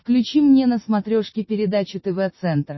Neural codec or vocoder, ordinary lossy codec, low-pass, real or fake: none; MP3, 24 kbps; 7.2 kHz; real